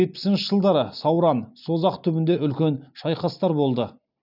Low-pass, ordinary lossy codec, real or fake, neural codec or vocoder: 5.4 kHz; none; real; none